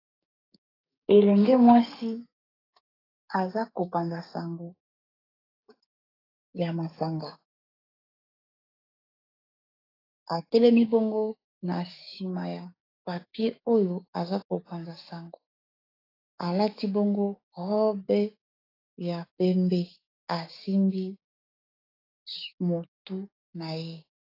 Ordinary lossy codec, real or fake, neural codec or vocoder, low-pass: AAC, 24 kbps; fake; codec, 16 kHz, 6 kbps, DAC; 5.4 kHz